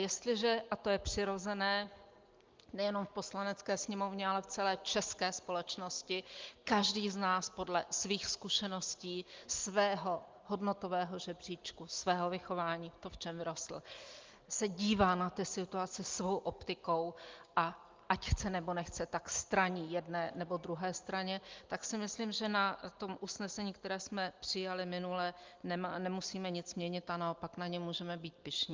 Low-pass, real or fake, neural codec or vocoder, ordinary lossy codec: 7.2 kHz; real; none; Opus, 32 kbps